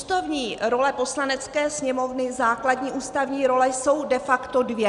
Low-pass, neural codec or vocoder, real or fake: 10.8 kHz; none; real